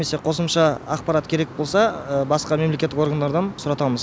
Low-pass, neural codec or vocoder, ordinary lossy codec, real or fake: none; none; none; real